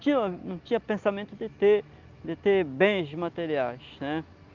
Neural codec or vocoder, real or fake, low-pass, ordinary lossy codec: none; real; 7.2 kHz; Opus, 24 kbps